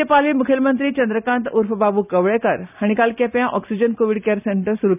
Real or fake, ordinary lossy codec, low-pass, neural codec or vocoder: real; none; 3.6 kHz; none